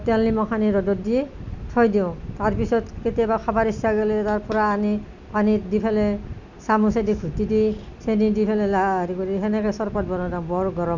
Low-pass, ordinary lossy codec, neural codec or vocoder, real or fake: 7.2 kHz; none; none; real